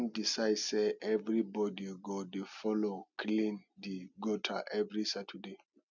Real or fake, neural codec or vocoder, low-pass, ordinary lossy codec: real; none; 7.2 kHz; none